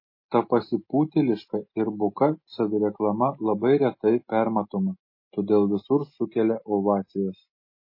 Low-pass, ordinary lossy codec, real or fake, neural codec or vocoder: 5.4 kHz; MP3, 24 kbps; real; none